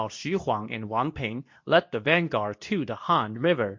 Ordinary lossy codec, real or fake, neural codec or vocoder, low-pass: MP3, 48 kbps; fake; codec, 24 kHz, 0.9 kbps, WavTokenizer, medium speech release version 2; 7.2 kHz